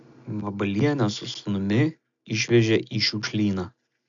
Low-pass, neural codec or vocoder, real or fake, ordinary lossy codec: 7.2 kHz; none; real; AAC, 64 kbps